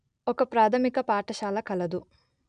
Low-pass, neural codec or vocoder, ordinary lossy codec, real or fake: 9.9 kHz; none; none; real